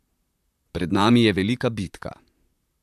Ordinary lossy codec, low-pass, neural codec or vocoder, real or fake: AAC, 96 kbps; 14.4 kHz; vocoder, 44.1 kHz, 128 mel bands, Pupu-Vocoder; fake